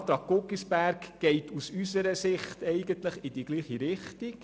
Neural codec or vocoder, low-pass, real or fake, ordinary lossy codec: none; none; real; none